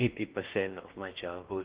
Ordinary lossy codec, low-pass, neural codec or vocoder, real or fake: Opus, 16 kbps; 3.6 kHz; codec, 16 kHz in and 24 kHz out, 0.8 kbps, FocalCodec, streaming, 65536 codes; fake